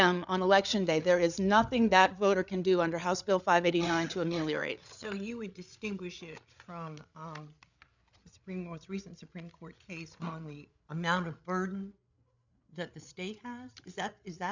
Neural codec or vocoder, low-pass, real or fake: codec, 16 kHz, 8 kbps, FreqCodec, larger model; 7.2 kHz; fake